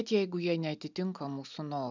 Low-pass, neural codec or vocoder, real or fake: 7.2 kHz; none; real